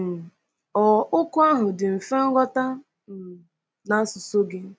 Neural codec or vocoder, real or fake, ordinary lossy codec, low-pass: none; real; none; none